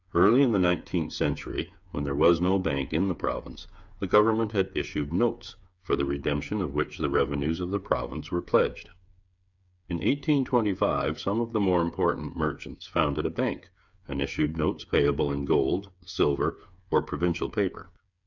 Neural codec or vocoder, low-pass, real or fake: codec, 16 kHz, 8 kbps, FreqCodec, smaller model; 7.2 kHz; fake